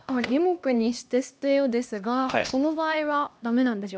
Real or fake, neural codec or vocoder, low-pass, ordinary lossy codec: fake; codec, 16 kHz, 1 kbps, X-Codec, HuBERT features, trained on LibriSpeech; none; none